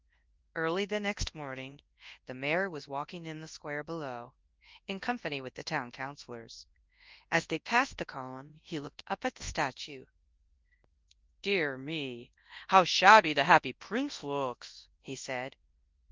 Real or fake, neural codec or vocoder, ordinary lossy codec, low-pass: fake; codec, 24 kHz, 0.9 kbps, WavTokenizer, large speech release; Opus, 32 kbps; 7.2 kHz